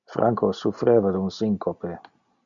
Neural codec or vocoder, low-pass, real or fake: none; 7.2 kHz; real